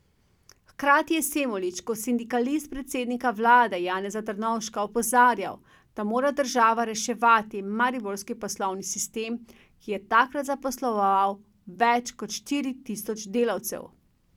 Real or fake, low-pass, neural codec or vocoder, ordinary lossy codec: real; 19.8 kHz; none; none